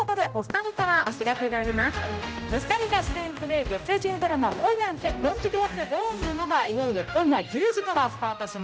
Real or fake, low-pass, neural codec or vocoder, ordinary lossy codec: fake; none; codec, 16 kHz, 0.5 kbps, X-Codec, HuBERT features, trained on general audio; none